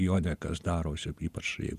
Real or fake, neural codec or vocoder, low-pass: fake; vocoder, 48 kHz, 128 mel bands, Vocos; 14.4 kHz